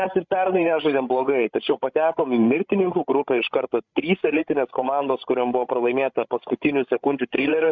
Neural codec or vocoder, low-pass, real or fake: codec, 16 kHz, 16 kbps, FreqCodec, larger model; 7.2 kHz; fake